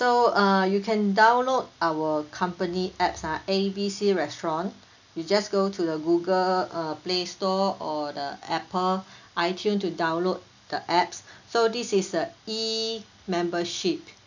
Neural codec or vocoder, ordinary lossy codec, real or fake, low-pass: none; none; real; 7.2 kHz